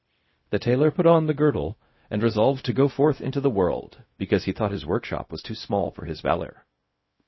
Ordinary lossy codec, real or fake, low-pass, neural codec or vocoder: MP3, 24 kbps; fake; 7.2 kHz; codec, 16 kHz, 0.4 kbps, LongCat-Audio-Codec